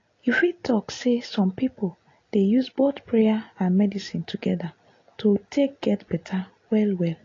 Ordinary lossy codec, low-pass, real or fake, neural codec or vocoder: AAC, 32 kbps; 7.2 kHz; real; none